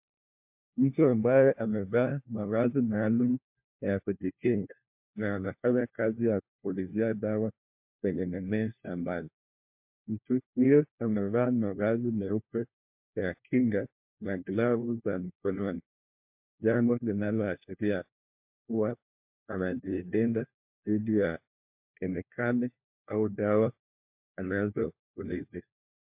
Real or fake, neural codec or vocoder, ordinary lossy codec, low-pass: fake; codec, 16 kHz, 1 kbps, FunCodec, trained on LibriTTS, 50 frames a second; MP3, 32 kbps; 3.6 kHz